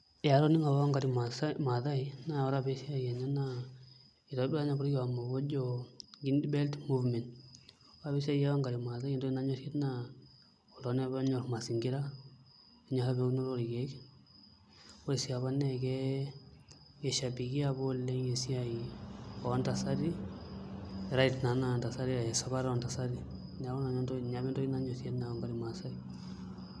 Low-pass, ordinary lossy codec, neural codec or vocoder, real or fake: none; none; none; real